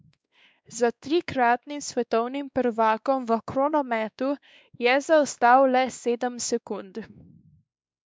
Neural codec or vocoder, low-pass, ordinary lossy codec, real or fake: codec, 16 kHz, 2 kbps, X-Codec, WavLM features, trained on Multilingual LibriSpeech; none; none; fake